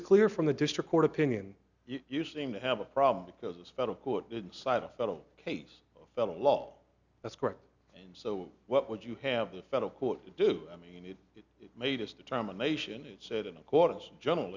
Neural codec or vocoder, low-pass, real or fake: none; 7.2 kHz; real